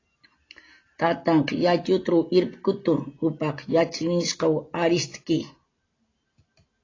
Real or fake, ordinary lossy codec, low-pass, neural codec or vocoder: real; AAC, 32 kbps; 7.2 kHz; none